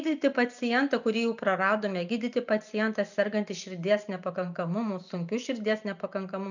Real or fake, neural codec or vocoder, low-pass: real; none; 7.2 kHz